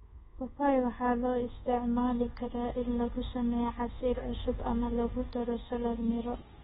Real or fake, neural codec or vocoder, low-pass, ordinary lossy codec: fake; codec, 24 kHz, 1.2 kbps, DualCodec; 10.8 kHz; AAC, 16 kbps